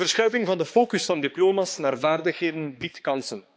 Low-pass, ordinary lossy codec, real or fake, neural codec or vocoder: none; none; fake; codec, 16 kHz, 2 kbps, X-Codec, HuBERT features, trained on balanced general audio